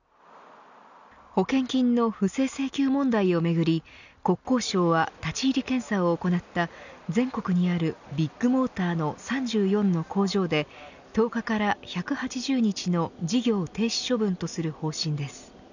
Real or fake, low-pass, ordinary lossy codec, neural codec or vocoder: real; 7.2 kHz; none; none